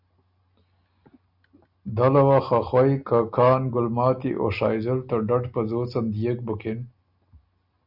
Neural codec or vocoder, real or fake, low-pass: none; real; 5.4 kHz